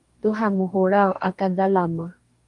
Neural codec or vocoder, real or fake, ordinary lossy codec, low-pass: codec, 44.1 kHz, 2.6 kbps, DAC; fake; Opus, 32 kbps; 10.8 kHz